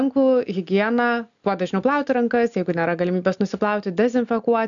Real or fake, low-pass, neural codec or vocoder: real; 7.2 kHz; none